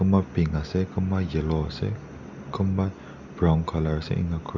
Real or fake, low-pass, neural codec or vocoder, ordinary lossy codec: real; 7.2 kHz; none; Opus, 64 kbps